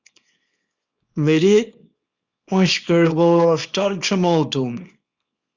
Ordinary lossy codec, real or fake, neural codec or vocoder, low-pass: Opus, 64 kbps; fake; codec, 24 kHz, 0.9 kbps, WavTokenizer, small release; 7.2 kHz